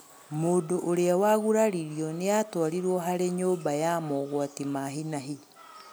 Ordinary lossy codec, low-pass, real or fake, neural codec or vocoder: none; none; real; none